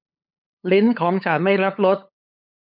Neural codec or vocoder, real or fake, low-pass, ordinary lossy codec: codec, 16 kHz, 8 kbps, FunCodec, trained on LibriTTS, 25 frames a second; fake; 5.4 kHz; none